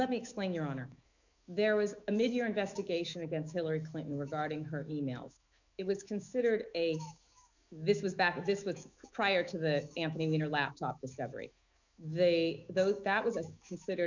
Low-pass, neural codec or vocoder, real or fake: 7.2 kHz; autoencoder, 48 kHz, 128 numbers a frame, DAC-VAE, trained on Japanese speech; fake